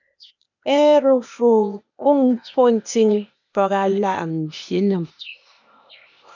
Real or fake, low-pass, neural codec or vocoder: fake; 7.2 kHz; codec, 16 kHz, 0.8 kbps, ZipCodec